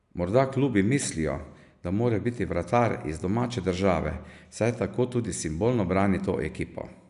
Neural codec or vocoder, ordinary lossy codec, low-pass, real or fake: none; none; 10.8 kHz; real